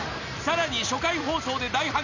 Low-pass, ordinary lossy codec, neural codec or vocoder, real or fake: 7.2 kHz; none; none; real